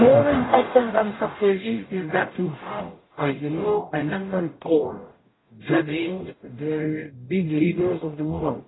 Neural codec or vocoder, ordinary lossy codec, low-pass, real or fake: codec, 44.1 kHz, 0.9 kbps, DAC; AAC, 16 kbps; 7.2 kHz; fake